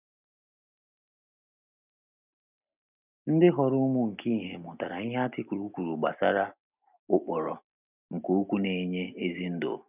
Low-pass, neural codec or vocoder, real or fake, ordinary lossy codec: 3.6 kHz; none; real; none